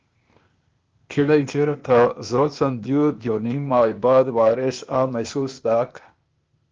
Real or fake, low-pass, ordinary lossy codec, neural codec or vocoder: fake; 7.2 kHz; Opus, 24 kbps; codec, 16 kHz, 0.8 kbps, ZipCodec